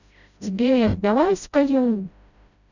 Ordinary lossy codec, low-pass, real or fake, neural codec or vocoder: none; 7.2 kHz; fake; codec, 16 kHz, 0.5 kbps, FreqCodec, smaller model